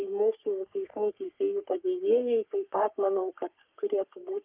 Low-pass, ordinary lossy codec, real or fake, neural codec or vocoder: 3.6 kHz; Opus, 24 kbps; fake; codec, 44.1 kHz, 3.4 kbps, Pupu-Codec